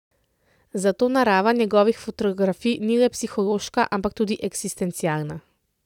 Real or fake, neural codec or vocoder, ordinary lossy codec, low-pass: real; none; none; 19.8 kHz